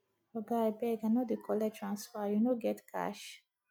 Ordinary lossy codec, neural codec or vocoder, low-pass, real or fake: none; none; 19.8 kHz; real